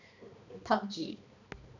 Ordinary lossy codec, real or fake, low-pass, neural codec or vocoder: none; fake; 7.2 kHz; codec, 16 kHz, 2 kbps, X-Codec, HuBERT features, trained on balanced general audio